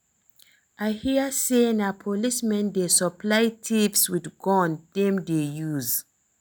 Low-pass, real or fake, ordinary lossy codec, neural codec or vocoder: none; real; none; none